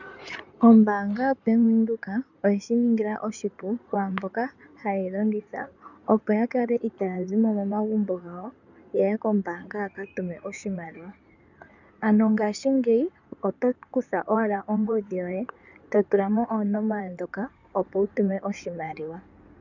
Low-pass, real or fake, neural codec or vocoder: 7.2 kHz; fake; codec, 16 kHz in and 24 kHz out, 2.2 kbps, FireRedTTS-2 codec